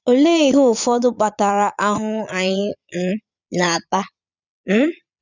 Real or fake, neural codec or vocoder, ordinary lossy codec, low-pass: fake; vocoder, 22.05 kHz, 80 mel bands, Vocos; none; 7.2 kHz